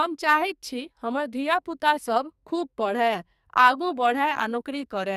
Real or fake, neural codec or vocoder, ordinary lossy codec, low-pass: fake; codec, 44.1 kHz, 2.6 kbps, SNAC; none; 14.4 kHz